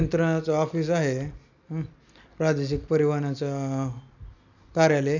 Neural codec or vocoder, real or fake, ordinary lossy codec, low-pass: none; real; none; 7.2 kHz